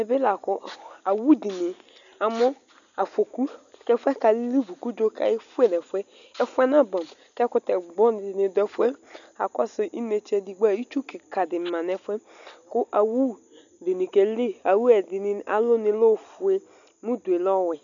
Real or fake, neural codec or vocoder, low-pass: real; none; 7.2 kHz